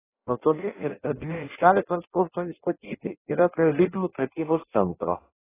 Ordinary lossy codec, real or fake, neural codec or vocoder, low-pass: AAC, 16 kbps; fake; codec, 16 kHz in and 24 kHz out, 0.6 kbps, FireRedTTS-2 codec; 3.6 kHz